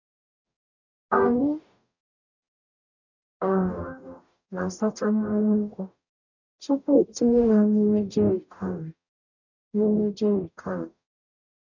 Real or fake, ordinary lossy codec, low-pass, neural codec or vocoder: fake; none; 7.2 kHz; codec, 44.1 kHz, 0.9 kbps, DAC